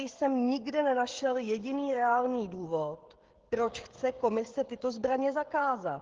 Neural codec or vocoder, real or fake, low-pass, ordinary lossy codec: codec, 16 kHz, 16 kbps, FreqCodec, smaller model; fake; 7.2 kHz; Opus, 16 kbps